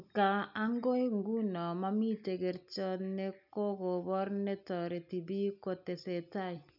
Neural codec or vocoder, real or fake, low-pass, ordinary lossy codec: none; real; 5.4 kHz; none